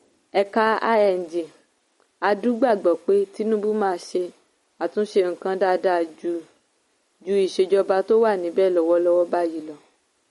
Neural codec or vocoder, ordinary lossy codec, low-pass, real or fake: none; MP3, 48 kbps; 19.8 kHz; real